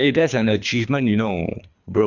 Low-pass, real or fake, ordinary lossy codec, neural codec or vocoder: 7.2 kHz; fake; none; codec, 24 kHz, 3 kbps, HILCodec